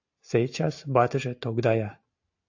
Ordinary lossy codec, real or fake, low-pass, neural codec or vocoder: AAC, 48 kbps; real; 7.2 kHz; none